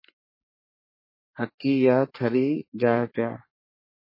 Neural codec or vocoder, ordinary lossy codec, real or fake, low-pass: codec, 44.1 kHz, 3.4 kbps, Pupu-Codec; MP3, 24 kbps; fake; 5.4 kHz